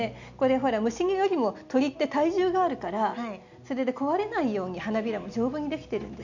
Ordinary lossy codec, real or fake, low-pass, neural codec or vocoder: none; real; 7.2 kHz; none